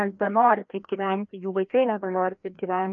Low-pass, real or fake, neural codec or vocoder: 7.2 kHz; fake; codec, 16 kHz, 1 kbps, FreqCodec, larger model